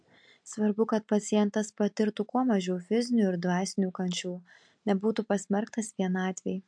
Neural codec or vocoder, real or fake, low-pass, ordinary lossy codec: none; real; 9.9 kHz; MP3, 64 kbps